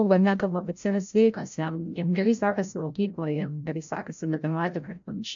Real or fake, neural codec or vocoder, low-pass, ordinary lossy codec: fake; codec, 16 kHz, 0.5 kbps, FreqCodec, larger model; 7.2 kHz; AAC, 64 kbps